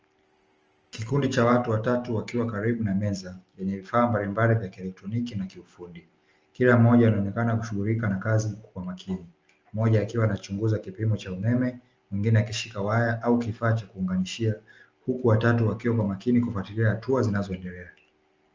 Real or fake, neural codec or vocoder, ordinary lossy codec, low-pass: real; none; Opus, 24 kbps; 7.2 kHz